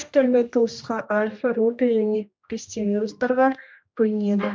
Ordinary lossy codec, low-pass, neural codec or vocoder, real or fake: none; none; codec, 16 kHz, 1 kbps, X-Codec, HuBERT features, trained on general audio; fake